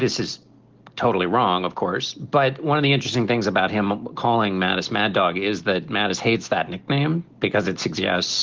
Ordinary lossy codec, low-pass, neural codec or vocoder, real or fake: Opus, 16 kbps; 7.2 kHz; none; real